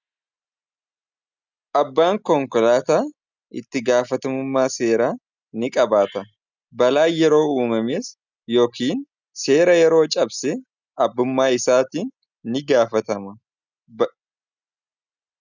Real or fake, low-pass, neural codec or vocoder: real; 7.2 kHz; none